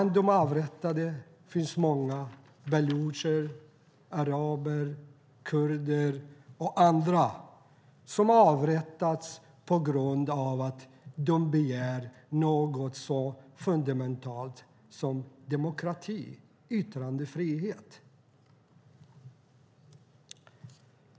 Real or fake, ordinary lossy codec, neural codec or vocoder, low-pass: real; none; none; none